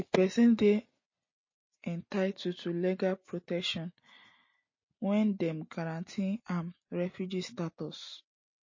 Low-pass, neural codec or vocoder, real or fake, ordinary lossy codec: 7.2 kHz; none; real; MP3, 32 kbps